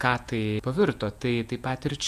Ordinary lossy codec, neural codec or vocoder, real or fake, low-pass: AAC, 96 kbps; vocoder, 48 kHz, 128 mel bands, Vocos; fake; 14.4 kHz